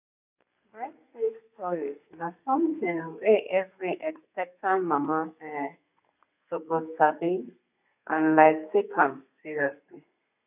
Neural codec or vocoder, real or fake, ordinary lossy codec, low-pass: codec, 32 kHz, 1.9 kbps, SNAC; fake; none; 3.6 kHz